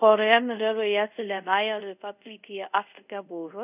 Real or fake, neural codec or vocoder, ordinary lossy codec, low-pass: fake; codec, 24 kHz, 0.5 kbps, DualCodec; none; 3.6 kHz